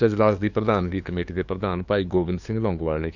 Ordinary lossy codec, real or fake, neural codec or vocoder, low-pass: none; fake; codec, 16 kHz, 2 kbps, FunCodec, trained on LibriTTS, 25 frames a second; 7.2 kHz